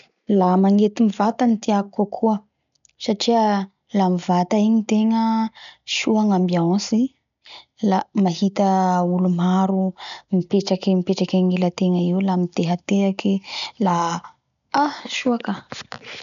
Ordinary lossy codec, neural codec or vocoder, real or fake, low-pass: none; none; real; 7.2 kHz